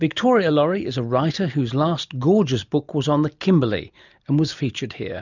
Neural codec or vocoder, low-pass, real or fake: none; 7.2 kHz; real